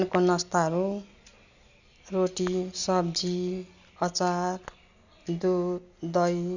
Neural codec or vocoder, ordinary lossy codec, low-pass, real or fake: none; none; 7.2 kHz; real